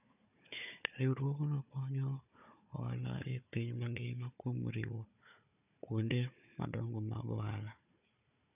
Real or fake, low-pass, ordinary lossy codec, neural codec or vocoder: fake; 3.6 kHz; none; codec, 16 kHz, 4 kbps, FunCodec, trained on Chinese and English, 50 frames a second